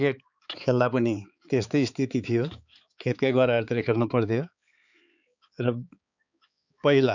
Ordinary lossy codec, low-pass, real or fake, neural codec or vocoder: none; 7.2 kHz; fake; codec, 16 kHz, 4 kbps, X-Codec, HuBERT features, trained on balanced general audio